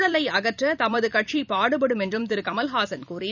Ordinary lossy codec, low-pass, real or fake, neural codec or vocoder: none; 7.2 kHz; fake; vocoder, 44.1 kHz, 128 mel bands every 256 samples, BigVGAN v2